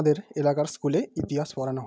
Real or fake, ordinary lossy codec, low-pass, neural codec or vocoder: real; none; none; none